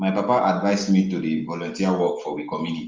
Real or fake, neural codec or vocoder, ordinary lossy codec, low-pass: real; none; Opus, 24 kbps; 7.2 kHz